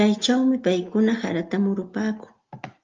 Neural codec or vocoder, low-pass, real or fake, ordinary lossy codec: none; 7.2 kHz; real; Opus, 24 kbps